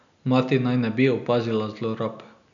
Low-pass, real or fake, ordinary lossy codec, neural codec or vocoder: 7.2 kHz; real; none; none